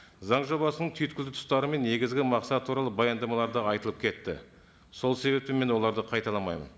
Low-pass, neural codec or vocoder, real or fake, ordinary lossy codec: none; none; real; none